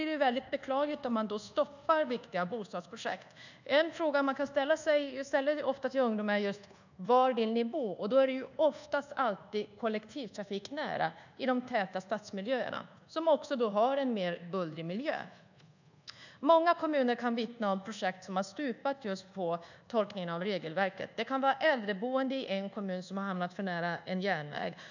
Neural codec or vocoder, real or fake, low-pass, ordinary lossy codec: codec, 24 kHz, 1.2 kbps, DualCodec; fake; 7.2 kHz; none